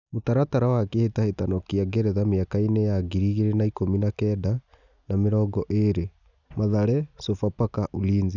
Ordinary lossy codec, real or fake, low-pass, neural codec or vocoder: none; real; 7.2 kHz; none